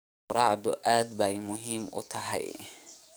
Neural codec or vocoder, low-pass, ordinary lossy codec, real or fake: codec, 44.1 kHz, 7.8 kbps, DAC; none; none; fake